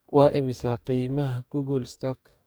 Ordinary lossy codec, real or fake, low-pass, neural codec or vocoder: none; fake; none; codec, 44.1 kHz, 2.6 kbps, DAC